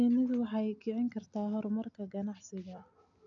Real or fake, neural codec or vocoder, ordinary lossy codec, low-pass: real; none; AAC, 64 kbps; 7.2 kHz